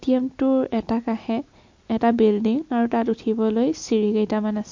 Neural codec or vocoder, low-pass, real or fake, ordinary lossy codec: none; 7.2 kHz; real; MP3, 48 kbps